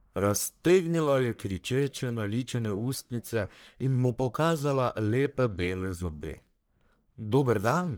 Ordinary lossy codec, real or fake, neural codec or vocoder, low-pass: none; fake; codec, 44.1 kHz, 1.7 kbps, Pupu-Codec; none